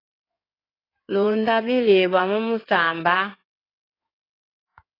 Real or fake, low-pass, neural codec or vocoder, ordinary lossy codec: fake; 5.4 kHz; codec, 16 kHz in and 24 kHz out, 2.2 kbps, FireRedTTS-2 codec; AAC, 24 kbps